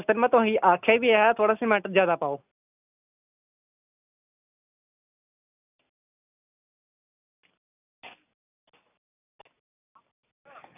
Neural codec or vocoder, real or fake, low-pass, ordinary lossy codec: none; real; 3.6 kHz; none